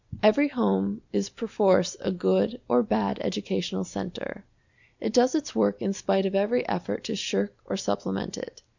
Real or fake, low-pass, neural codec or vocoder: real; 7.2 kHz; none